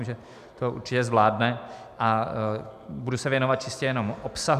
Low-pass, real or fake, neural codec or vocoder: 14.4 kHz; real; none